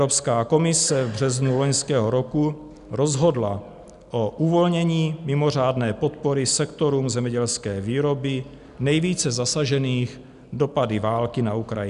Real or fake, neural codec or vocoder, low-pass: real; none; 10.8 kHz